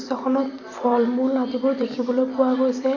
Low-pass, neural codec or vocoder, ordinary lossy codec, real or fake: 7.2 kHz; vocoder, 44.1 kHz, 128 mel bands every 256 samples, BigVGAN v2; none; fake